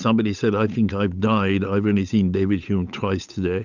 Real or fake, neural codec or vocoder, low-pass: fake; codec, 16 kHz, 8 kbps, FunCodec, trained on Chinese and English, 25 frames a second; 7.2 kHz